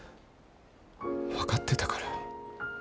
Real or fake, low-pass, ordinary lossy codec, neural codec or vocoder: real; none; none; none